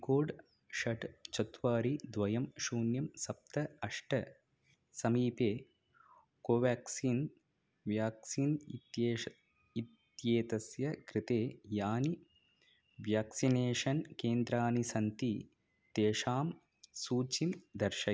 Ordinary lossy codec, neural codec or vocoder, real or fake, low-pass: none; none; real; none